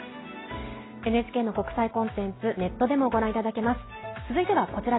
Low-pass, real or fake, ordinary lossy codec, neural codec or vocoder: 7.2 kHz; real; AAC, 16 kbps; none